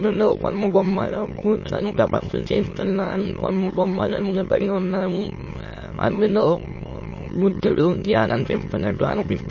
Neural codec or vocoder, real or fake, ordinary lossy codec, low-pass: autoencoder, 22.05 kHz, a latent of 192 numbers a frame, VITS, trained on many speakers; fake; MP3, 32 kbps; 7.2 kHz